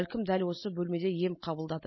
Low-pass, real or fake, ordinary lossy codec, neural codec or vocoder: 7.2 kHz; real; MP3, 24 kbps; none